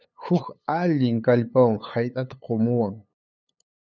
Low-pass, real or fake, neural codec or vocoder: 7.2 kHz; fake; codec, 16 kHz, 8 kbps, FunCodec, trained on LibriTTS, 25 frames a second